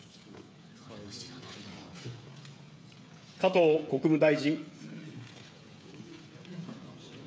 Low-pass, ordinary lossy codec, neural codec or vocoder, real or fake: none; none; codec, 16 kHz, 16 kbps, FreqCodec, smaller model; fake